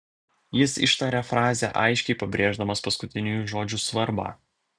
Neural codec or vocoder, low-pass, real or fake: none; 9.9 kHz; real